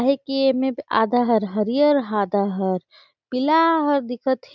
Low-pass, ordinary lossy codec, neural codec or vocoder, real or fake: 7.2 kHz; none; none; real